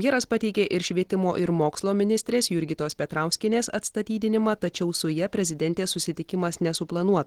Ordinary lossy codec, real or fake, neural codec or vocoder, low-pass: Opus, 16 kbps; real; none; 19.8 kHz